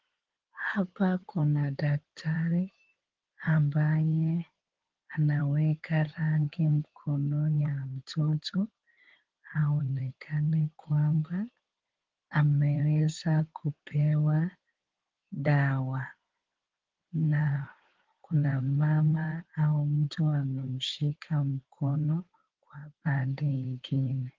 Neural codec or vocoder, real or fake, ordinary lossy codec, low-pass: codec, 16 kHz in and 24 kHz out, 2.2 kbps, FireRedTTS-2 codec; fake; Opus, 16 kbps; 7.2 kHz